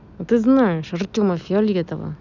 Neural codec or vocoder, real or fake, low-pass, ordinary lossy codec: none; real; 7.2 kHz; none